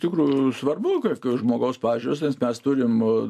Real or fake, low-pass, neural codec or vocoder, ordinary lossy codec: real; 14.4 kHz; none; MP3, 64 kbps